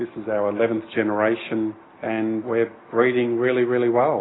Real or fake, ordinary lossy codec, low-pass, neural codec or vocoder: real; AAC, 16 kbps; 7.2 kHz; none